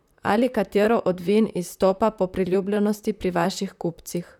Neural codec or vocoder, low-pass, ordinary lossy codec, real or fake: vocoder, 44.1 kHz, 128 mel bands, Pupu-Vocoder; 19.8 kHz; none; fake